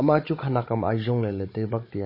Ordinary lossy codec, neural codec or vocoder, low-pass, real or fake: MP3, 24 kbps; codec, 16 kHz, 16 kbps, FunCodec, trained on Chinese and English, 50 frames a second; 5.4 kHz; fake